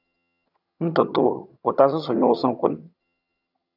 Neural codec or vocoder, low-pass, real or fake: vocoder, 22.05 kHz, 80 mel bands, HiFi-GAN; 5.4 kHz; fake